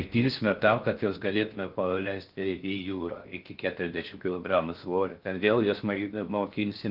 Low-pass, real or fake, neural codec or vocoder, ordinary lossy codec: 5.4 kHz; fake; codec, 16 kHz in and 24 kHz out, 0.6 kbps, FocalCodec, streaming, 4096 codes; Opus, 32 kbps